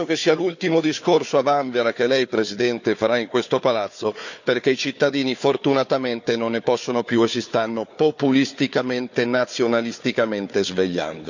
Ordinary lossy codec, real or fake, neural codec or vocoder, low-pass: none; fake; codec, 16 kHz, 4 kbps, FunCodec, trained on Chinese and English, 50 frames a second; 7.2 kHz